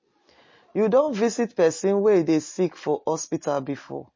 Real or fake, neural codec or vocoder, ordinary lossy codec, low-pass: real; none; MP3, 32 kbps; 7.2 kHz